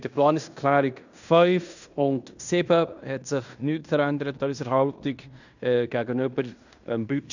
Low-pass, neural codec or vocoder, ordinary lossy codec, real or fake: 7.2 kHz; codec, 16 kHz in and 24 kHz out, 0.9 kbps, LongCat-Audio-Codec, fine tuned four codebook decoder; none; fake